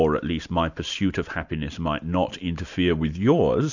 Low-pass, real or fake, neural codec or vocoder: 7.2 kHz; real; none